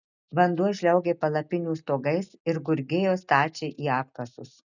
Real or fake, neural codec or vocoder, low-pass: real; none; 7.2 kHz